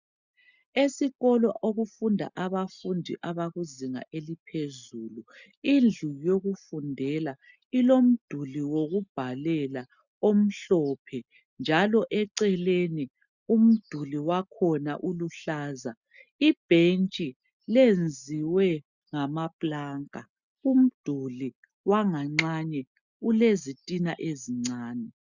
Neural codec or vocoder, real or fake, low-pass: none; real; 7.2 kHz